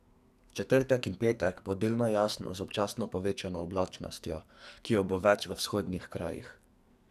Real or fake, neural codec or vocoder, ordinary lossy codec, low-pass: fake; codec, 44.1 kHz, 2.6 kbps, SNAC; none; 14.4 kHz